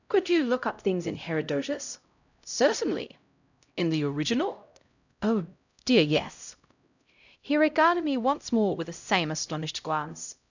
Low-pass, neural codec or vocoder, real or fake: 7.2 kHz; codec, 16 kHz, 0.5 kbps, X-Codec, HuBERT features, trained on LibriSpeech; fake